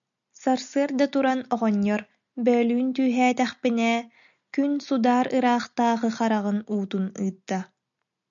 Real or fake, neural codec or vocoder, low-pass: real; none; 7.2 kHz